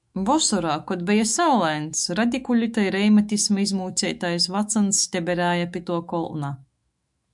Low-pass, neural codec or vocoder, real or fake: 10.8 kHz; autoencoder, 48 kHz, 128 numbers a frame, DAC-VAE, trained on Japanese speech; fake